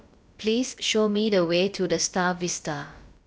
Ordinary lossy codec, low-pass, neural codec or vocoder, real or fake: none; none; codec, 16 kHz, about 1 kbps, DyCAST, with the encoder's durations; fake